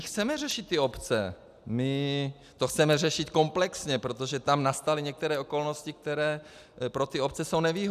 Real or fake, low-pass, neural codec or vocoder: real; 14.4 kHz; none